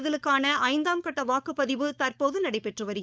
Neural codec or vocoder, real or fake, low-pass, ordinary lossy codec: codec, 16 kHz, 4.8 kbps, FACodec; fake; none; none